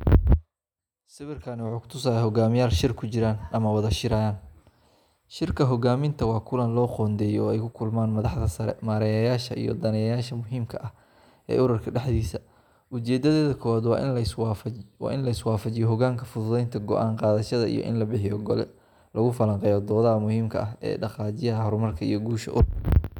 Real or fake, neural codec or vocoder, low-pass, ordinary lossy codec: real; none; 19.8 kHz; none